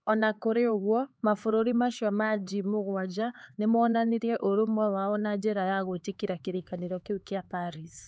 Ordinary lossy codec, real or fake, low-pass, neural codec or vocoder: none; fake; none; codec, 16 kHz, 4 kbps, X-Codec, HuBERT features, trained on LibriSpeech